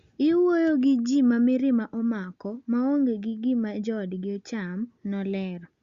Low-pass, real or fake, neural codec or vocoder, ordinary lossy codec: 7.2 kHz; real; none; Opus, 64 kbps